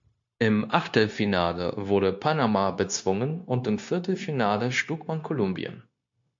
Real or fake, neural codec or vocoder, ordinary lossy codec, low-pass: fake; codec, 16 kHz, 0.9 kbps, LongCat-Audio-Codec; MP3, 48 kbps; 7.2 kHz